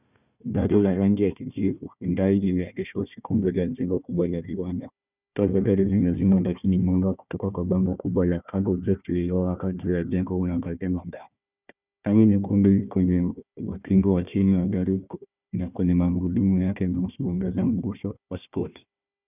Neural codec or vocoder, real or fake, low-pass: codec, 16 kHz, 1 kbps, FunCodec, trained on Chinese and English, 50 frames a second; fake; 3.6 kHz